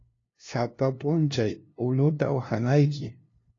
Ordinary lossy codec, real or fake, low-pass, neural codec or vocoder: AAC, 32 kbps; fake; 7.2 kHz; codec, 16 kHz, 0.5 kbps, FunCodec, trained on LibriTTS, 25 frames a second